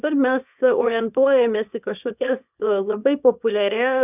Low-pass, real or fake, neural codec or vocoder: 3.6 kHz; fake; codec, 24 kHz, 0.9 kbps, WavTokenizer, small release